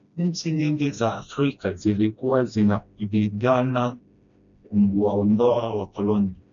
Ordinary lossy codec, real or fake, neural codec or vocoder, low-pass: MP3, 96 kbps; fake; codec, 16 kHz, 1 kbps, FreqCodec, smaller model; 7.2 kHz